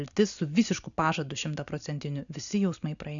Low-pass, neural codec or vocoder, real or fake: 7.2 kHz; none; real